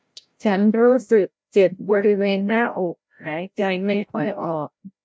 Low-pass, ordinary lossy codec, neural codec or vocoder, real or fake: none; none; codec, 16 kHz, 0.5 kbps, FreqCodec, larger model; fake